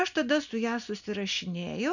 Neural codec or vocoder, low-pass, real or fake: none; 7.2 kHz; real